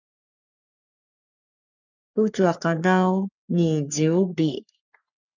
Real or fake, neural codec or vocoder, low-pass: fake; codec, 44.1 kHz, 3.4 kbps, Pupu-Codec; 7.2 kHz